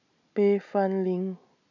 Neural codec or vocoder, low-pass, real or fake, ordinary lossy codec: none; 7.2 kHz; real; none